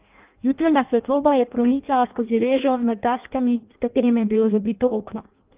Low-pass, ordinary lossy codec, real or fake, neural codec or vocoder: 3.6 kHz; Opus, 24 kbps; fake; codec, 16 kHz in and 24 kHz out, 0.6 kbps, FireRedTTS-2 codec